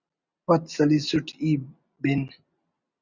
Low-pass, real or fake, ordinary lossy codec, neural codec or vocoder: 7.2 kHz; real; Opus, 64 kbps; none